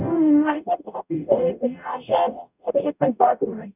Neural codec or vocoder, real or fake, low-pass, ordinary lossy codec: codec, 44.1 kHz, 0.9 kbps, DAC; fake; 3.6 kHz; none